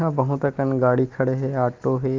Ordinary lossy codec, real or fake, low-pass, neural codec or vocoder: Opus, 32 kbps; real; 7.2 kHz; none